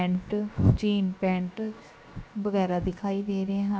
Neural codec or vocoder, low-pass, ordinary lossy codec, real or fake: codec, 16 kHz, 0.7 kbps, FocalCodec; none; none; fake